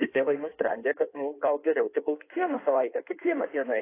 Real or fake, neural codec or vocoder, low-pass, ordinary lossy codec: fake; codec, 16 kHz in and 24 kHz out, 1.1 kbps, FireRedTTS-2 codec; 3.6 kHz; AAC, 24 kbps